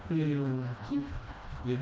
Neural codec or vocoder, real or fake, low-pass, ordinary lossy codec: codec, 16 kHz, 1 kbps, FreqCodec, smaller model; fake; none; none